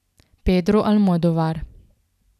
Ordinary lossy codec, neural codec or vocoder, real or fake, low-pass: none; none; real; 14.4 kHz